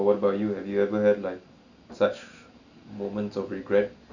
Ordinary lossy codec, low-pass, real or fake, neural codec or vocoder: none; 7.2 kHz; real; none